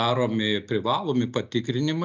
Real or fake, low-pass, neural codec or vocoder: real; 7.2 kHz; none